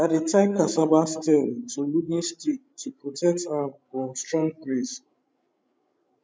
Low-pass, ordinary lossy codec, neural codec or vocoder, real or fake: none; none; codec, 16 kHz, 16 kbps, FreqCodec, larger model; fake